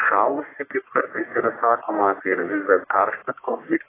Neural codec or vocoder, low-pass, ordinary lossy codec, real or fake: codec, 44.1 kHz, 1.7 kbps, Pupu-Codec; 3.6 kHz; AAC, 16 kbps; fake